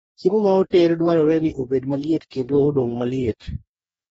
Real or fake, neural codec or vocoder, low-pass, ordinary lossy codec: fake; codec, 44.1 kHz, 2.6 kbps, DAC; 19.8 kHz; AAC, 24 kbps